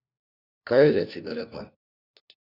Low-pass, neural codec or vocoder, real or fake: 5.4 kHz; codec, 16 kHz, 1 kbps, FunCodec, trained on LibriTTS, 50 frames a second; fake